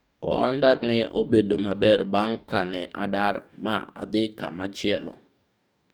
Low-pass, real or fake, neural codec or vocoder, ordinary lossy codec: none; fake; codec, 44.1 kHz, 2.6 kbps, DAC; none